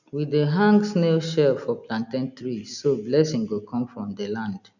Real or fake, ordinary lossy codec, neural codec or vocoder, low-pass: real; none; none; 7.2 kHz